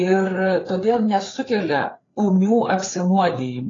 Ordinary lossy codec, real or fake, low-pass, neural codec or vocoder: AAC, 32 kbps; fake; 7.2 kHz; codec, 16 kHz, 4 kbps, FreqCodec, larger model